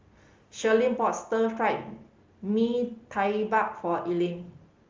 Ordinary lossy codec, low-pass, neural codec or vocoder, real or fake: Opus, 32 kbps; 7.2 kHz; none; real